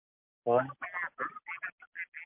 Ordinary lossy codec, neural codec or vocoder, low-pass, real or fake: MP3, 32 kbps; none; 3.6 kHz; real